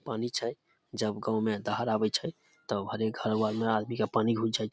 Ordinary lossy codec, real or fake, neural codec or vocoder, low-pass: none; real; none; none